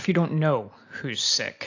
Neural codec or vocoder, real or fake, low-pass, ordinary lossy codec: none; real; 7.2 kHz; AAC, 48 kbps